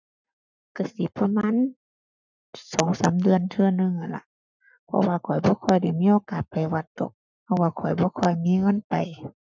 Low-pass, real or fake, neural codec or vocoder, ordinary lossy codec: 7.2 kHz; fake; codec, 44.1 kHz, 7.8 kbps, Pupu-Codec; none